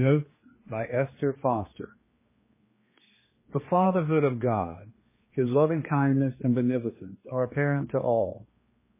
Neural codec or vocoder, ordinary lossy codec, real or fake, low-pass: codec, 16 kHz, 2 kbps, X-Codec, HuBERT features, trained on general audio; MP3, 16 kbps; fake; 3.6 kHz